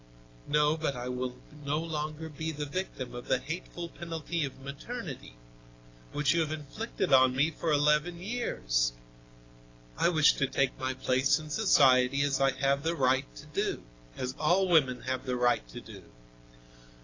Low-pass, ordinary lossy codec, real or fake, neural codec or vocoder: 7.2 kHz; AAC, 32 kbps; real; none